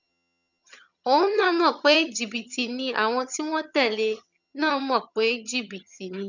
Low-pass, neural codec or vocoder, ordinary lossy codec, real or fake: 7.2 kHz; vocoder, 22.05 kHz, 80 mel bands, HiFi-GAN; none; fake